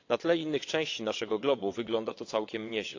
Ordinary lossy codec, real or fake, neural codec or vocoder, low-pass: AAC, 48 kbps; fake; vocoder, 22.05 kHz, 80 mel bands, WaveNeXt; 7.2 kHz